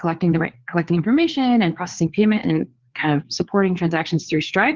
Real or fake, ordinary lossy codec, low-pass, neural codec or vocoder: fake; Opus, 16 kbps; 7.2 kHz; codec, 16 kHz, 4 kbps, FreqCodec, larger model